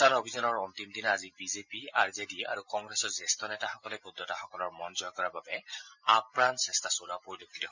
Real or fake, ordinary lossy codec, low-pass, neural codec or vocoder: real; Opus, 64 kbps; 7.2 kHz; none